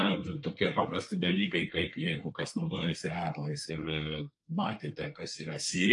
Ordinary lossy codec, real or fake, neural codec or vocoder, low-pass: AAC, 64 kbps; fake; codec, 24 kHz, 1 kbps, SNAC; 10.8 kHz